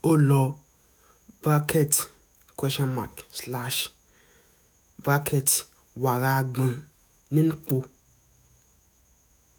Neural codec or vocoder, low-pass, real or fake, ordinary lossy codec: autoencoder, 48 kHz, 128 numbers a frame, DAC-VAE, trained on Japanese speech; none; fake; none